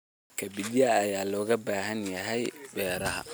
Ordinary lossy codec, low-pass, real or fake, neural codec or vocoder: none; none; real; none